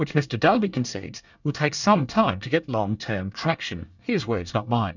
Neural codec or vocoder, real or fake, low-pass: codec, 24 kHz, 1 kbps, SNAC; fake; 7.2 kHz